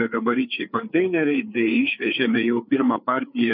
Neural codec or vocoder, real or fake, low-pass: codec, 16 kHz, 4 kbps, FreqCodec, larger model; fake; 5.4 kHz